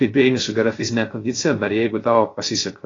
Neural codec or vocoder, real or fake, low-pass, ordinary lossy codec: codec, 16 kHz, 0.3 kbps, FocalCodec; fake; 7.2 kHz; AAC, 32 kbps